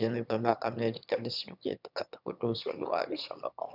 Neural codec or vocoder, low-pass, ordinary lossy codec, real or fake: autoencoder, 22.05 kHz, a latent of 192 numbers a frame, VITS, trained on one speaker; 5.4 kHz; none; fake